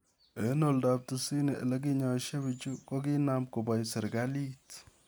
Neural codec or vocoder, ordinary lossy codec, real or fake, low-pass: none; none; real; none